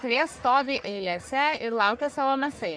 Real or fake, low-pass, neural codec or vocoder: fake; 9.9 kHz; codec, 44.1 kHz, 1.7 kbps, Pupu-Codec